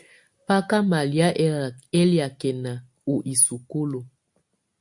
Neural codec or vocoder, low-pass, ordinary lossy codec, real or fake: none; 10.8 kHz; MP3, 64 kbps; real